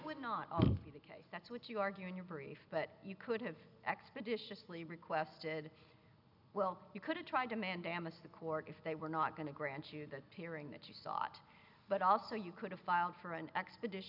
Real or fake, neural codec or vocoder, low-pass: real; none; 5.4 kHz